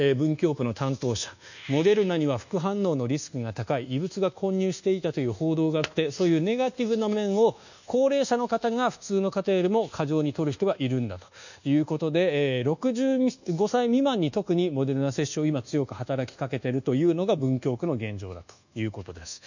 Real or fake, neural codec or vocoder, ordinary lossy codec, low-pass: fake; codec, 24 kHz, 1.2 kbps, DualCodec; none; 7.2 kHz